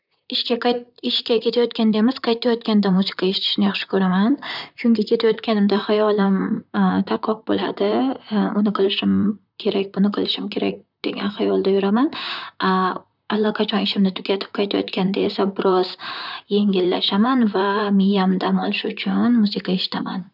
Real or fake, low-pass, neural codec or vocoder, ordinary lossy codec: fake; 5.4 kHz; vocoder, 44.1 kHz, 128 mel bands, Pupu-Vocoder; none